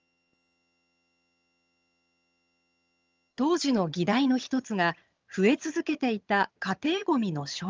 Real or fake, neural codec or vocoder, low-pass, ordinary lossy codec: fake; vocoder, 22.05 kHz, 80 mel bands, HiFi-GAN; 7.2 kHz; Opus, 24 kbps